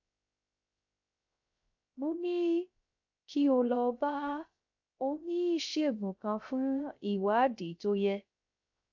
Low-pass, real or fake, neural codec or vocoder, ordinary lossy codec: 7.2 kHz; fake; codec, 16 kHz, 0.3 kbps, FocalCodec; AAC, 48 kbps